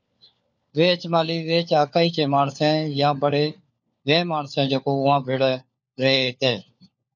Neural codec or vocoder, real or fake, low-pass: codec, 16 kHz, 4 kbps, FunCodec, trained on LibriTTS, 50 frames a second; fake; 7.2 kHz